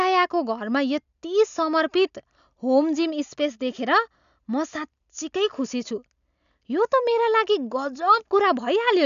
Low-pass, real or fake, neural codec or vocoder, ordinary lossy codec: 7.2 kHz; real; none; none